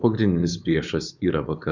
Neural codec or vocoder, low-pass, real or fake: codec, 16 kHz, 4.8 kbps, FACodec; 7.2 kHz; fake